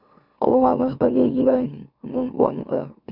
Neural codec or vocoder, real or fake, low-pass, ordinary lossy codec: autoencoder, 44.1 kHz, a latent of 192 numbers a frame, MeloTTS; fake; 5.4 kHz; none